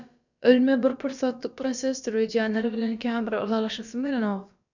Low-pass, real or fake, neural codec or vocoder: 7.2 kHz; fake; codec, 16 kHz, about 1 kbps, DyCAST, with the encoder's durations